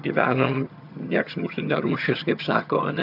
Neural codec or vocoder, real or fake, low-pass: vocoder, 22.05 kHz, 80 mel bands, HiFi-GAN; fake; 5.4 kHz